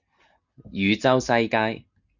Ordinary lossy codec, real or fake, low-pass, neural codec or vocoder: Opus, 64 kbps; real; 7.2 kHz; none